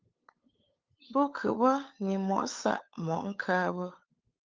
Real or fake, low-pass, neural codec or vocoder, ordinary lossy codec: fake; 7.2 kHz; codec, 16 kHz, 8 kbps, FunCodec, trained on LibriTTS, 25 frames a second; Opus, 24 kbps